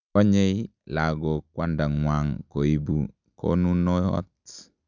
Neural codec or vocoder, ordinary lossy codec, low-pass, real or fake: none; none; 7.2 kHz; real